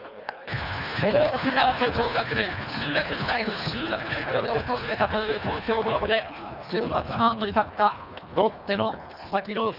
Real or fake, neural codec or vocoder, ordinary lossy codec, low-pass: fake; codec, 24 kHz, 1.5 kbps, HILCodec; none; 5.4 kHz